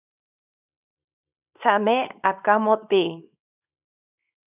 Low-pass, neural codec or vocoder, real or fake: 3.6 kHz; codec, 24 kHz, 0.9 kbps, WavTokenizer, small release; fake